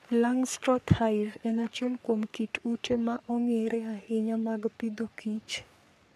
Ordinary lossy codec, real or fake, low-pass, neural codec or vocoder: AAC, 96 kbps; fake; 14.4 kHz; codec, 44.1 kHz, 3.4 kbps, Pupu-Codec